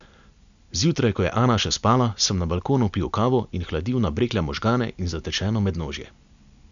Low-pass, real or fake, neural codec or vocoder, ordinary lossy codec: 7.2 kHz; real; none; none